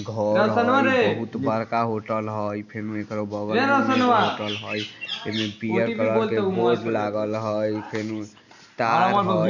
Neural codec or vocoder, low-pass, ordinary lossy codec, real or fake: none; 7.2 kHz; none; real